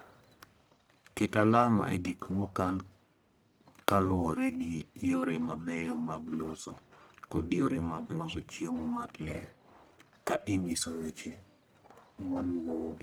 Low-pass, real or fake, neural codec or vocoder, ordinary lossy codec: none; fake; codec, 44.1 kHz, 1.7 kbps, Pupu-Codec; none